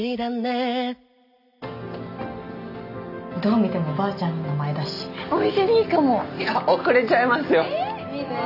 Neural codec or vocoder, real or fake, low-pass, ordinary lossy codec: none; real; 5.4 kHz; none